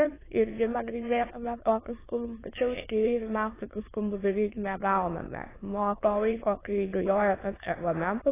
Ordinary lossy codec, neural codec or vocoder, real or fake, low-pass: AAC, 16 kbps; autoencoder, 22.05 kHz, a latent of 192 numbers a frame, VITS, trained on many speakers; fake; 3.6 kHz